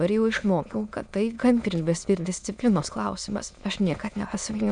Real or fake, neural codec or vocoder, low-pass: fake; autoencoder, 22.05 kHz, a latent of 192 numbers a frame, VITS, trained on many speakers; 9.9 kHz